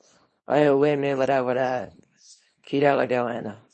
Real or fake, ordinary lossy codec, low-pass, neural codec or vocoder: fake; MP3, 32 kbps; 10.8 kHz; codec, 24 kHz, 0.9 kbps, WavTokenizer, small release